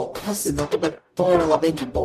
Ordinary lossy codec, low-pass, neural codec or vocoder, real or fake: MP3, 96 kbps; 14.4 kHz; codec, 44.1 kHz, 0.9 kbps, DAC; fake